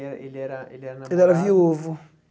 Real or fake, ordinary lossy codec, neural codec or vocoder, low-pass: real; none; none; none